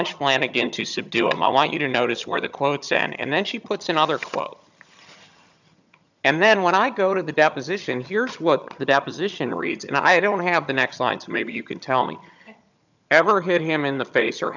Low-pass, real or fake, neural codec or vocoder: 7.2 kHz; fake; vocoder, 22.05 kHz, 80 mel bands, HiFi-GAN